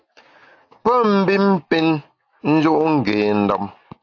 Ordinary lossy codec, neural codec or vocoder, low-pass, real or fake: AAC, 48 kbps; none; 7.2 kHz; real